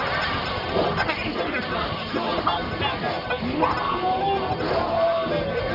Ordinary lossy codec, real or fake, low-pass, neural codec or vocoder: AAC, 48 kbps; fake; 5.4 kHz; codec, 44.1 kHz, 1.7 kbps, Pupu-Codec